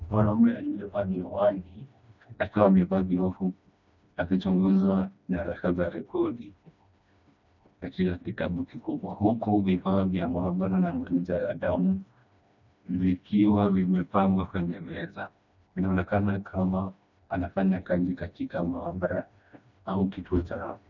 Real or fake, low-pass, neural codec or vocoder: fake; 7.2 kHz; codec, 16 kHz, 1 kbps, FreqCodec, smaller model